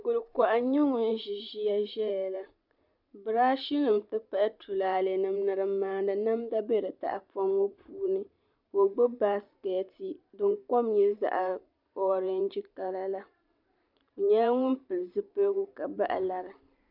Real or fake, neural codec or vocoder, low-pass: fake; vocoder, 44.1 kHz, 128 mel bands every 512 samples, BigVGAN v2; 5.4 kHz